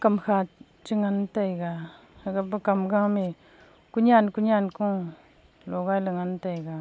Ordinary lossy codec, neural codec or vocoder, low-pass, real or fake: none; none; none; real